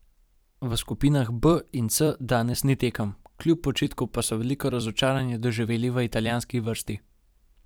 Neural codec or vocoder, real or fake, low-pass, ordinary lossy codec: vocoder, 44.1 kHz, 128 mel bands every 256 samples, BigVGAN v2; fake; none; none